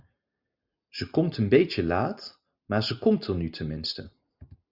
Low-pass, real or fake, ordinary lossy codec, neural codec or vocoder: 5.4 kHz; real; Opus, 64 kbps; none